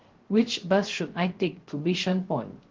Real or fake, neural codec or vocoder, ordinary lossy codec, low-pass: fake; codec, 16 kHz, 0.3 kbps, FocalCodec; Opus, 16 kbps; 7.2 kHz